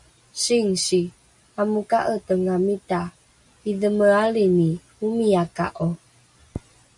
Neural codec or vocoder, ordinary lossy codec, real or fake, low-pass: none; MP3, 64 kbps; real; 10.8 kHz